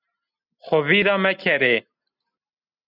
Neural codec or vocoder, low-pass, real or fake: none; 5.4 kHz; real